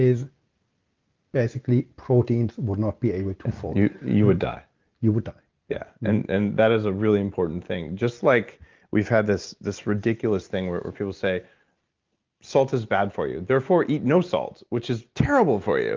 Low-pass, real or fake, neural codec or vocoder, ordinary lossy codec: 7.2 kHz; real; none; Opus, 24 kbps